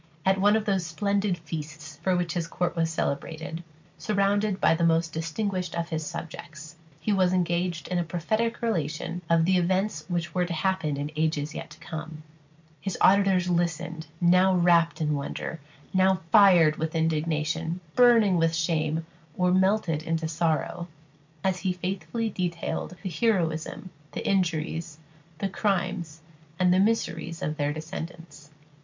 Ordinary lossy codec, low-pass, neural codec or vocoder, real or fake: MP3, 64 kbps; 7.2 kHz; none; real